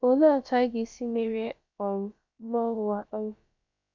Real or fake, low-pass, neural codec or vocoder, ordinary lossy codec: fake; 7.2 kHz; codec, 16 kHz, about 1 kbps, DyCAST, with the encoder's durations; MP3, 64 kbps